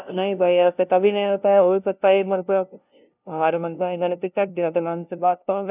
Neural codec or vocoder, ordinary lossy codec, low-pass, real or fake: codec, 16 kHz, 0.5 kbps, FunCodec, trained on LibriTTS, 25 frames a second; none; 3.6 kHz; fake